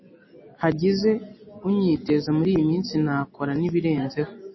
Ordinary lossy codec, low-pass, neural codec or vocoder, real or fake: MP3, 24 kbps; 7.2 kHz; none; real